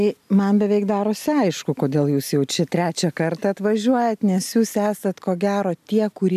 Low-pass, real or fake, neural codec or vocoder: 14.4 kHz; real; none